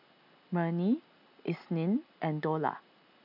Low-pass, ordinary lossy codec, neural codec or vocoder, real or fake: 5.4 kHz; none; none; real